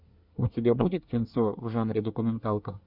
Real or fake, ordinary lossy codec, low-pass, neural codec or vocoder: fake; Opus, 64 kbps; 5.4 kHz; codec, 24 kHz, 1 kbps, SNAC